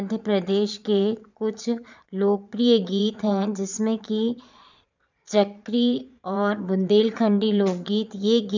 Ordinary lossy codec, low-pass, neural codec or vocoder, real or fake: none; 7.2 kHz; vocoder, 22.05 kHz, 80 mel bands, Vocos; fake